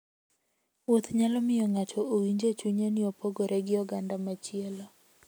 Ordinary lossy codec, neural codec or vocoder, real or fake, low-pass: none; none; real; none